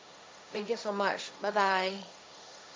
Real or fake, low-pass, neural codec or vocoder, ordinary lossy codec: fake; none; codec, 16 kHz, 1.1 kbps, Voila-Tokenizer; none